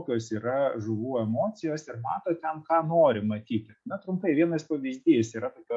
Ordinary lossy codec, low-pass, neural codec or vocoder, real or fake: MP3, 48 kbps; 10.8 kHz; none; real